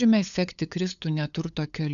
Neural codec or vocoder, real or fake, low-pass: codec, 16 kHz, 8 kbps, FunCodec, trained on LibriTTS, 25 frames a second; fake; 7.2 kHz